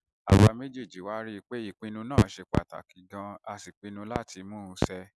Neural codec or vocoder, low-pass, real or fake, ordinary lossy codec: none; none; real; none